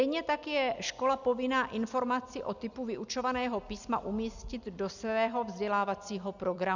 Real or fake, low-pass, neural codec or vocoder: real; 7.2 kHz; none